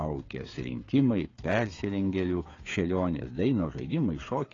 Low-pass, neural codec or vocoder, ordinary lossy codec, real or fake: 7.2 kHz; codec, 16 kHz, 8 kbps, FreqCodec, smaller model; AAC, 32 kbps; fake